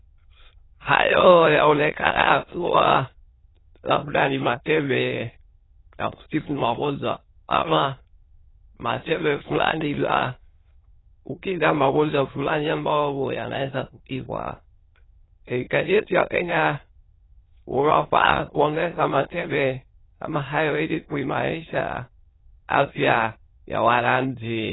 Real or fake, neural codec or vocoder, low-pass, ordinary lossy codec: fake; autoencoder, 22.05 kHz, a latent of 192 numbers a frame, VITS, trained on many speakers; 7.2 kHz; AAC, 16 kbps